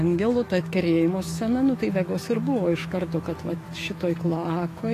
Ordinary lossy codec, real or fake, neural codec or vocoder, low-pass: AAC, 48 kbps; fake; autoencoder, 48 kHz, 128 numbers a frame, DAC-VAE, trained on Japanese speech; 14.4 kHz